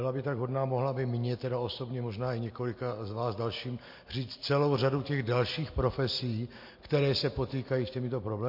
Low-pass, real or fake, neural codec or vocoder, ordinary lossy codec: 5.4 kHz; real; none; MP3, 32 kbps